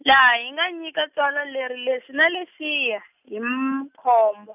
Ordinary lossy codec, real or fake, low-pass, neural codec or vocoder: none; real; 3.6 kHz; none